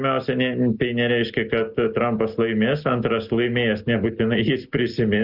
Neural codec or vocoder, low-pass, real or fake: none; 5.4 kHz; real